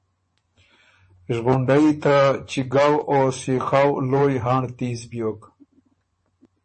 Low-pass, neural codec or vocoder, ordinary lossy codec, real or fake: 10.8 kHz; vocoder, 24 kHz, 100 mel bands, Vocos; MP3, 32 kbps; fake